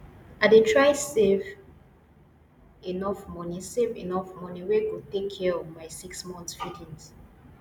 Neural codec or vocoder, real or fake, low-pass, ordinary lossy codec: none; real; 19.8 kHz; none